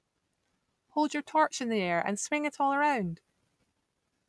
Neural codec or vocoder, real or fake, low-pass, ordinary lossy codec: none; real; none; none